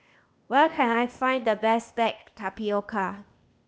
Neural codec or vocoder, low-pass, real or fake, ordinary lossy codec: codec, 16 kHz, 0.8 kbps, ZipCodec; none; fake; none